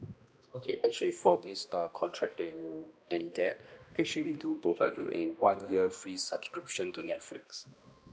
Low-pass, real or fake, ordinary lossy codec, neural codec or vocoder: none; fake; none; codec, 16 kHz, 1 kbps, X-Codec, HuBERT features, trained on balanced general audio